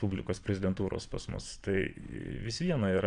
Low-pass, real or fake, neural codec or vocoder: 9.9 kHz; fake; vocoder, 22.05 kHz, 80 mel bands, Vocos